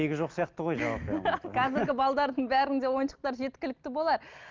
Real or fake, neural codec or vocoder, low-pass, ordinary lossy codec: real; none; 7.2 kHz; Opus, 32 kbps